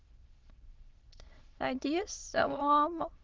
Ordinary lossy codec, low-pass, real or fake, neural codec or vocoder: Opus, 16 kbps; 7.2 kHz; fake; autoencoder, 22.05 kHz, a latent of 192 numbers a frame, VITS, trained on many speakers